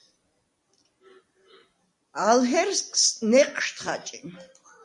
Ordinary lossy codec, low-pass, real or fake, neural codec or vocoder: MP3, 64 kbps; 10.8 kHz; real; none